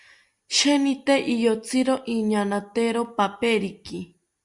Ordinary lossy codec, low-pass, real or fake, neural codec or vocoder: Opus, 64 kbps; 10.8 kHz; real; none